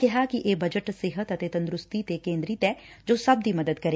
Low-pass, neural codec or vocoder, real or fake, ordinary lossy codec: none; none; real; none